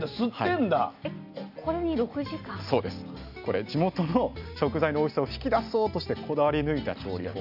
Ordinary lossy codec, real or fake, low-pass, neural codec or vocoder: none; real; 5.4 kHz; none